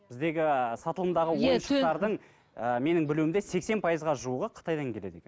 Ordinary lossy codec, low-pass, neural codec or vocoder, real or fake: none; none; none; real